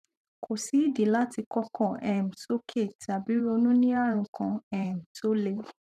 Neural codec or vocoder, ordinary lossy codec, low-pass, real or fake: vocoder, 44.1 kHz, 128 mel bands every 512 samples, BigVGAN v2; none; 14.4 kHz; fake